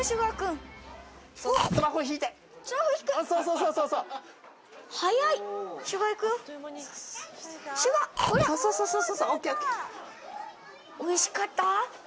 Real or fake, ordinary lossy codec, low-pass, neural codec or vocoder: real; none; none; none